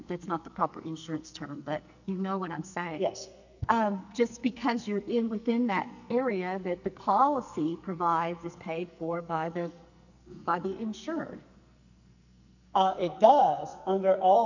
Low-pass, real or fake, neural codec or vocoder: 7.2 kHz; fake; codec, 44.1 kHz, 2.6 kbps, SNAC